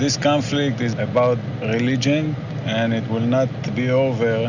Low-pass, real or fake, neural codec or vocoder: 7.2 kHz; real; none